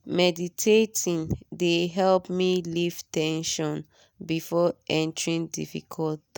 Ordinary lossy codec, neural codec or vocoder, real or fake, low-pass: none; none; real; none